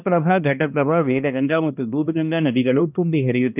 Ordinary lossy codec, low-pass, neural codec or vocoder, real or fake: none; 3.6 kHz; codec, 16 kHz, 1 kbps, X-Codec, HuBERT features, trained on balanced general audio; fake